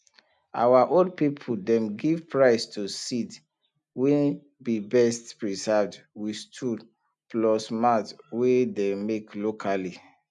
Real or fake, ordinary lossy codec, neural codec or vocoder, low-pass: real; none; none; 10.8 kHz